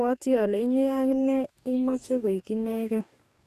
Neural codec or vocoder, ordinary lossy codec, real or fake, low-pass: codec, 44.1 kHz, 2.6 kbps, DAC; none; fake; 14.4 kHz